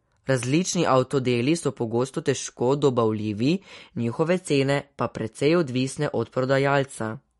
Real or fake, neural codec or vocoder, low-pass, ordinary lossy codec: real; none; 19.8 kHz; MP3, 48 kbps